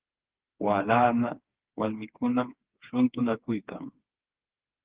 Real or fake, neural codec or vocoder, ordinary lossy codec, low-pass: fake; codec, 16 kHz, 4 kbps, FreqCodec, smaller model; Opus, 16 kbps; 3.6 kHz